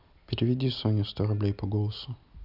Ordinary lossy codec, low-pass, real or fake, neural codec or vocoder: none; 5.4 kHz; real; none